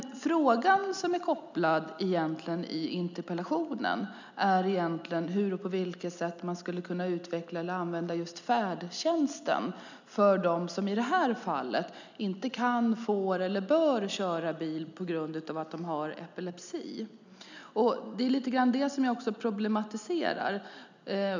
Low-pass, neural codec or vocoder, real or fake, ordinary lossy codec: 7.2 kHz; none; real; none